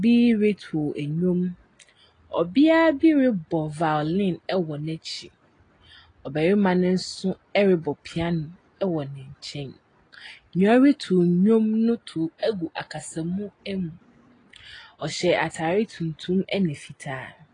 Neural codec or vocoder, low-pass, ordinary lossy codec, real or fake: none; 9.9 kHz; AAC, 32 kbps; real